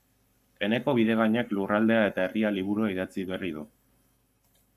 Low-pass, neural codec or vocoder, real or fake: 14.4 kHz; codec, 44.1 kHz, 7.8 kbps, Pupu-Codec; fake